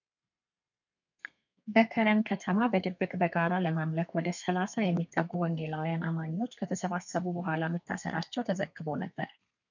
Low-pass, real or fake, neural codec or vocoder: 7.2 kHz; fake; codec, 32 kHz, 1.9 kbps, SNAC